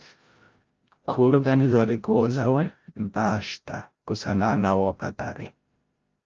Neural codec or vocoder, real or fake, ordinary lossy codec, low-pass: codec, 16 kHz, 0.5 kbps, FreqCodec, larger model; fake; Opus, 24 kbps; 7.2 kHz